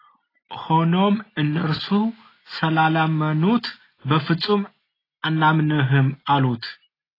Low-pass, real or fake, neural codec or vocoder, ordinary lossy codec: 5.4 kHz; real; none; AAC, 24 kbps